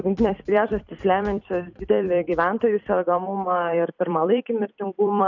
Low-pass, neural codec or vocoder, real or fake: 7.2 kHz; vocoder, 44.1 kHz, 128 mel bands every 256 samples, BigVGAN v2; fake